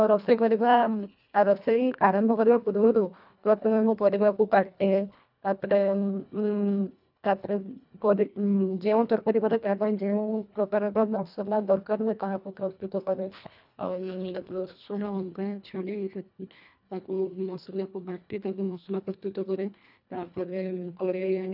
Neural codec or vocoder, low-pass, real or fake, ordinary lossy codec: codec, 24 kHz, 1.5 kbps, HILCodec; 5.4 kHz; fake; none